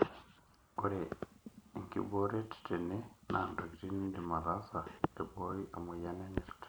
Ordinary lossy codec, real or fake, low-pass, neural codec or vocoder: none; real; none; none